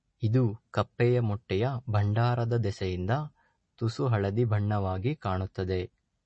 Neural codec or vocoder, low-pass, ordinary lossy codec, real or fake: none; 9.9 kHz; MP3, 32 kbps; real